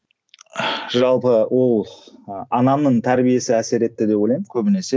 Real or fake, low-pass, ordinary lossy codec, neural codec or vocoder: real; none; none; none